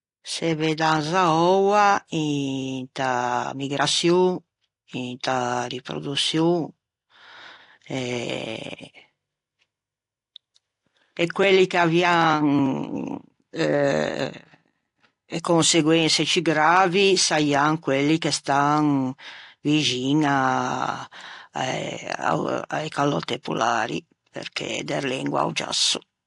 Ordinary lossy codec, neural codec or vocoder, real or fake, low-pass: AAC, 48 kbps; none; real; 10.8 kHz